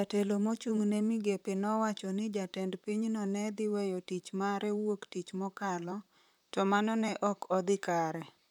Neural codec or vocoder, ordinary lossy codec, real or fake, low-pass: vocoder, 44.1 kHz, 128 mel bands, Pupu-Vocoder; none; fake; 19.8 kHz